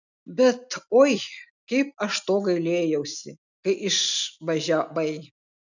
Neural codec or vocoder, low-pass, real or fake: none; 7.2 kHz; real